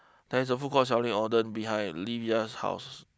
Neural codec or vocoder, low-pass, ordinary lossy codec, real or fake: none; none; none; real